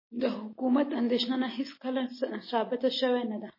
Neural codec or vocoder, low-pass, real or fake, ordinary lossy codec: none; 5.4 kHz; real; MP3, 24 kbps